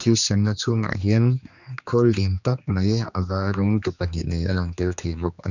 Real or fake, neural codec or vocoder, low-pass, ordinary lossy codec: fake; codec, 16 kHz, 2 kbps, X-Codec, HuBERT features, trained on general audio; 7.2 kHz; none